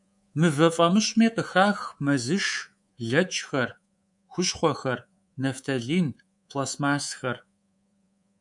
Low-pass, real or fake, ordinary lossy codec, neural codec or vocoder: 10.8 kHz; fake; MP3, 96 kbps; codec, 24 kHz, 3.1 kbps, DualCodec